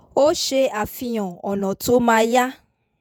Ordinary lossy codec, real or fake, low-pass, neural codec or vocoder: none; fake; none; vocoder, 48 kHz, 128 mel bands, Vocos